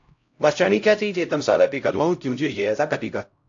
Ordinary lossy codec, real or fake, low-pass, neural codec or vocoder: AAC, 48 kbps; fake; 7.2 kHz; codec, 16 kHz, 0.5 kbps, X-Codec, HuBERT features, trained on LibriSpeech